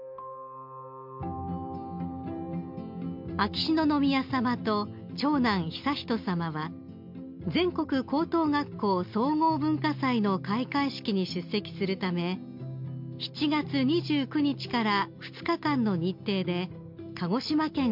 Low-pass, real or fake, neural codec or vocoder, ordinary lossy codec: 5.4 kHz; real; none; MP3, 48 kbps